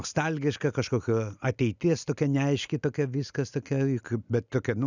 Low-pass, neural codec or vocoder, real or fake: 7.2 kHz; none; real